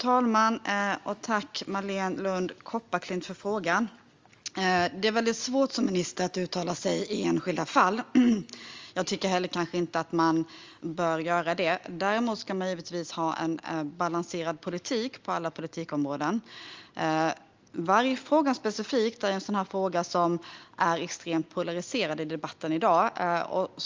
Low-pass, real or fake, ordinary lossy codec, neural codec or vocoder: 7.2 kHz; real; Opus, 32 kbps; none